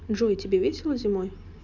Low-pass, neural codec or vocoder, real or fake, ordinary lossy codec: 7.2 kHz; none; real; none